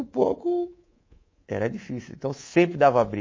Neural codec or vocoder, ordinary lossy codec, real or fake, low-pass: codec, 24 kHz, 3.1 kbps, DualCodec; MP3, 32 kbps; fake; 7.2 kHz